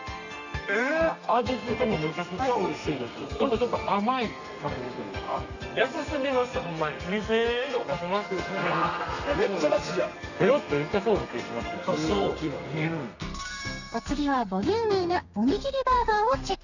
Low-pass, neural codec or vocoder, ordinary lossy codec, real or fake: 7.2 kHz; codec, 32 kHz, 1.9 kbps, SNAC; none; fake